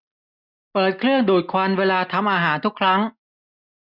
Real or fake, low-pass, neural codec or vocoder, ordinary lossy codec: real; 5.4 kHz; none; none